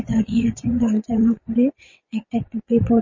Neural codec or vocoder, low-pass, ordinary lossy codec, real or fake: vocoder, 22.05 kHz, 80 mel bands, WaveNeXt; 7.2 kHz; MP3, 32 kbps; fake